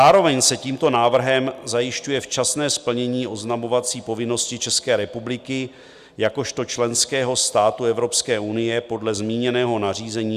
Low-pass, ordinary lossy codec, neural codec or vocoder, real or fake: 14.4 kHz; Opus, 64 kbps; none; real